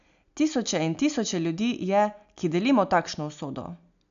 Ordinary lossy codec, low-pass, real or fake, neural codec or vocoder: none; 7.2 kHz; real; none